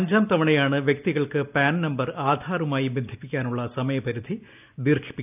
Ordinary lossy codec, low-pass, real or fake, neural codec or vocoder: none; 3.6 kHz; real; none